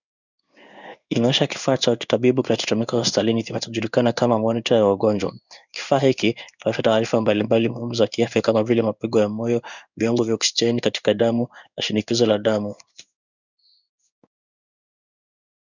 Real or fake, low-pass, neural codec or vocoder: fake; 7.2 kHz; codec, 16 kHz in and 24 kHz out, 1 kbps, XY-Tokenizer